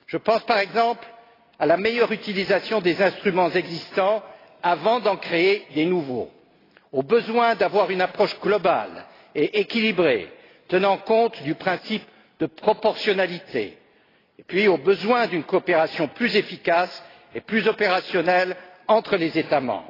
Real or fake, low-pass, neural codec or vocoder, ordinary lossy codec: real; 5.4 kHz; none; AAC, 24 kbps